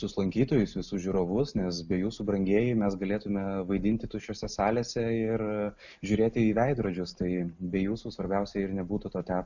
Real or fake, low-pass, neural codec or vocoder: real; 7.2 kHz; none